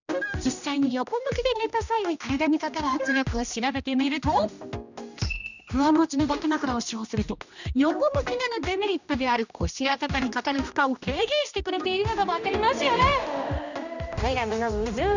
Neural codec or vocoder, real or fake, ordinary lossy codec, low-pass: codec, 16 kHz, 1 kbps, X-Codec, HuBERT features, trained on general audio; fake; none; 7.2 kHz